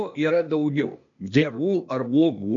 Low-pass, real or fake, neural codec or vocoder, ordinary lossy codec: 7.2 kHz; fake; codec, 16 kHz, 0.8 kbps, ZipCodec; MP3, 48 kbps